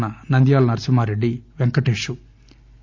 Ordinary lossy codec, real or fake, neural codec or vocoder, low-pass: MP3, 64 kbps; real; none; 7.2 kHz